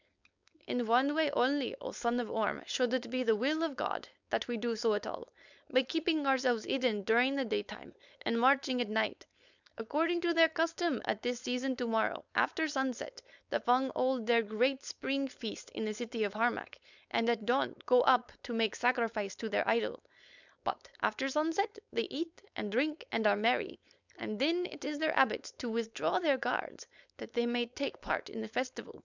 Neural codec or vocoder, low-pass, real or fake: codec, 16 kHz, 4.8 kbps, FACodec; 7.2 kHz; fake